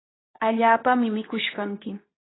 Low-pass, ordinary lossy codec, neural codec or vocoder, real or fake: 7.2 kHz; AAC, 16 kbps; codec, 24 kHz, 0.9 kbps, WavTokenizer, medium speech release version 2; fake